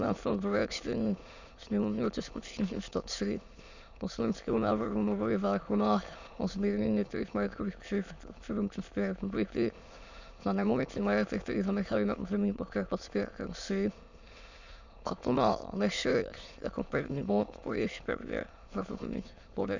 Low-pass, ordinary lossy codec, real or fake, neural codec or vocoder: 7.2 kHz; Opus, 64 kbps; fake; autoencoder, 22.05 kHz, a latent of 192 numbers a frame, VITS, trained on many speakers